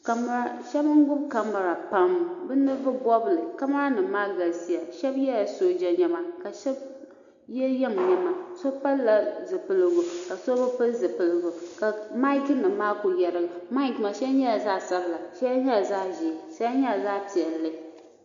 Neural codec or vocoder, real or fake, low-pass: none; real; 7.2 kHz